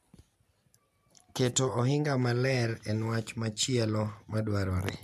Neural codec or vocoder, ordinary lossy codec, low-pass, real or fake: none; MP3, 96 kbps; 14.4 kHz; real